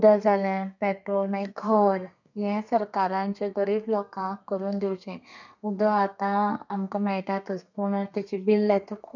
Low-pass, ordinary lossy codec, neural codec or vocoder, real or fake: 7.2 kHz; none; codec, 32 kHz, 1.9 kbps, SNAC; fake